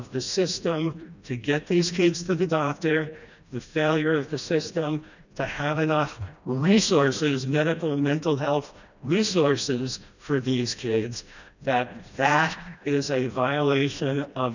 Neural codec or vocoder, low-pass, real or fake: codec, 16 kHz, 1 kbps, FreqCodec, smaller model; 7.2 kHz; fake